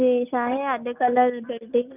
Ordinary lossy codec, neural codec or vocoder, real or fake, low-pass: none; none; real; 3.6 kHz